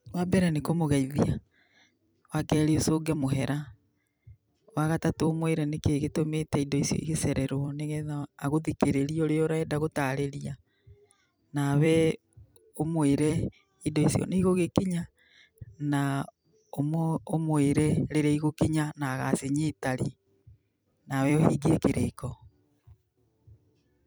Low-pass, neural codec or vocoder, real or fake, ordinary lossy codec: none; vocoder, 44.1 kHz, 128 mel bands every 256 samples, BigVGAN v2; fake; none